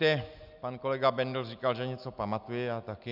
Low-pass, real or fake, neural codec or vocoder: 5.4 kHz; real; none